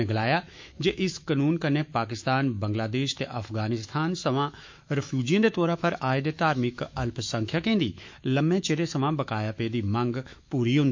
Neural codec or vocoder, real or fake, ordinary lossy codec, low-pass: autoencoder, 48 kHz, 128 numbers a frame, DAC-VAE, trained on Japanese speech; fake; MP3, 48 kbps; 7.2 kHz